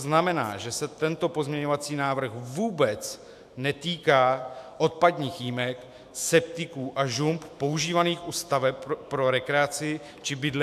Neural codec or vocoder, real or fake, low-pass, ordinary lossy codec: autoencoder, 48 kHz, 128 numbers a frame, DAC-VAE, trained on Japanese speech; fake; 14.4 kHz; AAC, 96 kbps